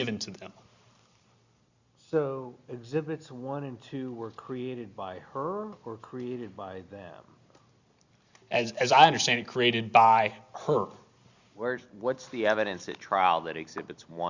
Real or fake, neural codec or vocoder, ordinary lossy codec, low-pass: real; none; Opus, 64 kbps; 7.2 kHz